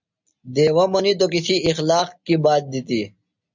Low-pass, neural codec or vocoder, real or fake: 7.2 kHz; none; real